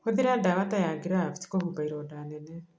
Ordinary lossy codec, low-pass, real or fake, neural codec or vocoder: none; none; real; none